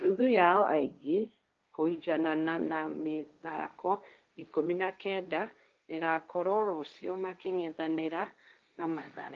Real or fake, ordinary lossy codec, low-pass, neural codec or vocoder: fake; Opus, 24 kbps; 7.2 kHz; codec, 16 kHz, 1.1 kbps, Voila-Tokenizer